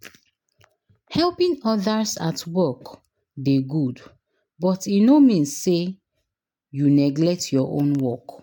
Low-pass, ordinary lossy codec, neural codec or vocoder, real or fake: 19.8 kHz; MP3, 96 kbps; none; real